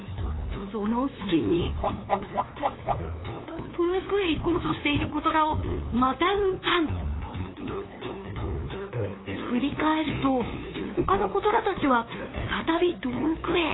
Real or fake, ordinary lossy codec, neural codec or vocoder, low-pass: fake; AAC, 16 kbps; codec, 16 kHz, 2 kbps, FunCodec, trained on LibriTTS, 25 frames a second; 7.2 kHz